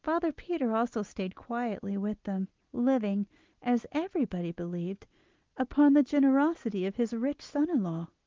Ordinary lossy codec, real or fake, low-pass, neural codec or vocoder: Opus, 32 kbps; real; 7.2 kHz; none